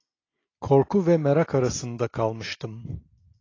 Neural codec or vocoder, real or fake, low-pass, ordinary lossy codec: none; real; 7.2 kHz; AAC, 32 kbps